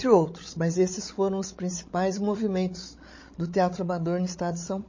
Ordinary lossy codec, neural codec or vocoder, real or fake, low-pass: MP3, 32 kbps; codec, 16 kHz, 16 kbps, FreqCodec, larger model; fake; 7.2 kHz